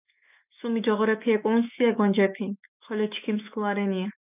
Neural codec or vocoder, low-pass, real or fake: autoencoder, 48 kHz, 128 numbers a frame, DAC-VAE, trained on Japanese speech; 3.6 kHz; fake